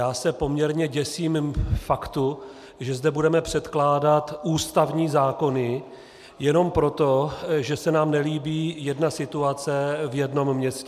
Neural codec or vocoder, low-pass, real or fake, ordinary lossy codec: none; 14.4 kHz; real; MP3, 96 kbps